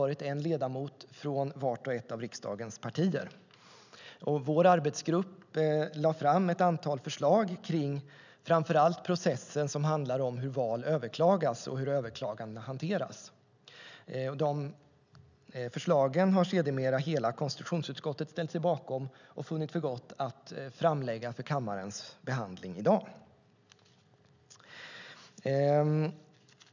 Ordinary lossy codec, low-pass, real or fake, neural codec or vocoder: none; 7.2 kHz; real; none